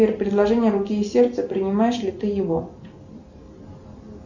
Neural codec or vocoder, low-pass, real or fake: none; 7.2 kHz; real